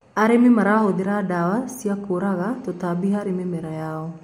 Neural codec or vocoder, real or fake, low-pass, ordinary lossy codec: none; real; 19.8 kHz; MP3, 64 kbps